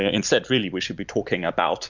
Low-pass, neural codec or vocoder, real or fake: 7.2 kHz; none; real